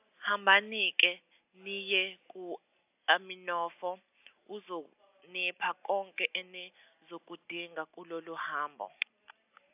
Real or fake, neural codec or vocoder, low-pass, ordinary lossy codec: real; none; 3.6 kHz; AAC, 32 kbps